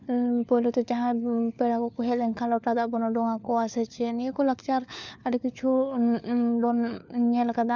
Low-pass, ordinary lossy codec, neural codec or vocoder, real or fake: 7.2 kHz; none; codec, 16 kHz, 4 kbps, FunCodec, trained on LibriTTS, 50 frames a second; fake